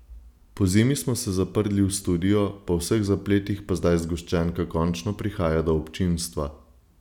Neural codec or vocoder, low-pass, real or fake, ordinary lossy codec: none; 19.8 kHz; real; none